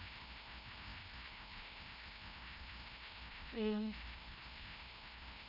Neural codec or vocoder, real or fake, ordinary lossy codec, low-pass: codec, 16 kHz, 1 kbps, FreqCodec, larger model; fake; none; 5.4 kHz